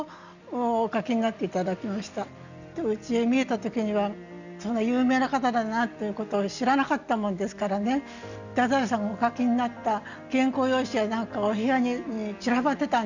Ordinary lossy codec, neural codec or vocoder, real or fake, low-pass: none; none; real; 7.2 kHz